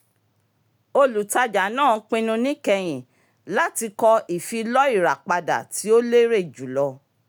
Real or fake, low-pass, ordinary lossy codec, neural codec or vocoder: real; none; none; none